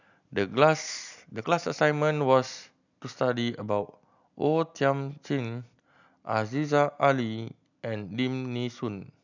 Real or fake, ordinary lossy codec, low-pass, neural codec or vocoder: real; none; 7.2 kHz; none